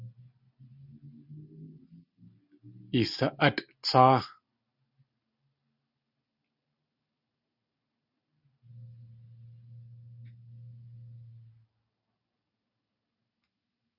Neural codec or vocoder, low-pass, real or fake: none; 5.4 kHz; real